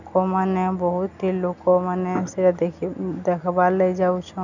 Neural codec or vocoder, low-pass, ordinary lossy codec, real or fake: none; 7.2 kHz; none; real